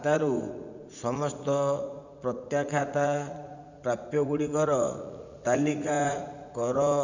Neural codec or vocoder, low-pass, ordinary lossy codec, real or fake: vocoder, 44.1 kHz, 80 mel bands, Vocos; 7.2 kHz; none; fake